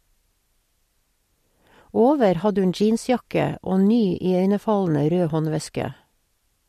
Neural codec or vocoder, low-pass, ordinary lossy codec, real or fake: none; 14.4 kHz; MP3, 64 kbps; real